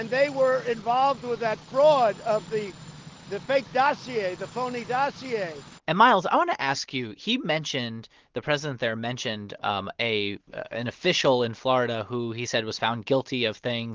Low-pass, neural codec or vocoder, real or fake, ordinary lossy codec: 7.2 kHz; none; real; Opus, 16 kbps